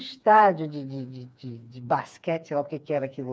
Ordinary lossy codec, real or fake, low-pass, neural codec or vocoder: none; fake; none; codec, 16 kHz, 4 kbps, FreqCodec, smaller model